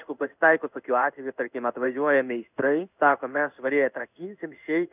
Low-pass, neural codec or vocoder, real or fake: 3.6 kHz; codec, 16 kHz in and 24 kHz out, 1 kbps, XY-Tokenizer; fake